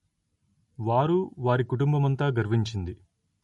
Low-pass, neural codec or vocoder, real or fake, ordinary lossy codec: 19.8 kHz; none; real; MP3, 48 kbps